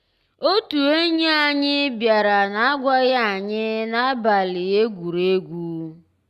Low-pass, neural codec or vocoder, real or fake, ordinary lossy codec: 14.4 kHz; none; real; Opus, 64 kbps